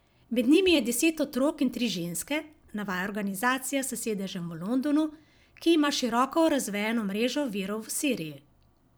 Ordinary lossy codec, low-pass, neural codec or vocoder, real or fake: none; none; vocoder, 44.1 kHz, 128 mel bands every 256 samples, BigVGAN v2; fake